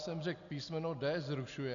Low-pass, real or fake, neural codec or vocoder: 7.2 kHz; real; none